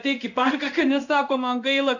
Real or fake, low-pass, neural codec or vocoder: fake; 7.2 kHz; codec, 16 kHz in and 24 kHz out, 1 kbps, XY-Tokenizer